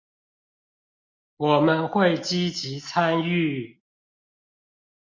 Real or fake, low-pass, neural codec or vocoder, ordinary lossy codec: real; 7.2 kHz; none; MP3, 48 kbps